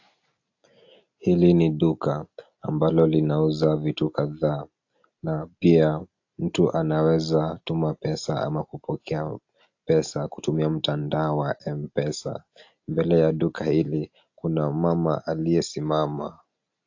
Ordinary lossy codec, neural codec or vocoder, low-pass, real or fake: AAC, 48 kbps; none; 7.2 kHz; real